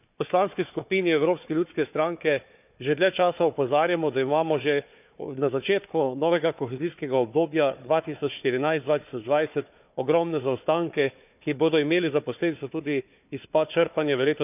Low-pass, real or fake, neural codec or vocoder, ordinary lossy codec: 3.6 kHz; fake; codec, 16 kHz, 4 kbps, FunCodec, trained on Chinese and English, 50 frames a second; none